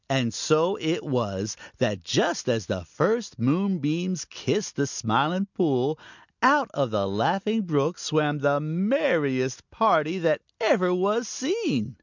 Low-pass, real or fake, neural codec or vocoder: 7.2 kHz; real; none